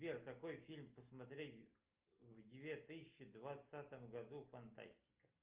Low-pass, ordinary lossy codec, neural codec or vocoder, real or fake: 3.6 kHz; Opus, 24 kbps; none; real